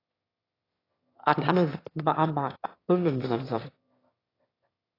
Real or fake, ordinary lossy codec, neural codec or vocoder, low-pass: fake; AAC, 24 kbps; autoencoder, 22.05 kHz, a latent of 192 numbers a frame, VITS, trained on one speaker; 5.4 kHz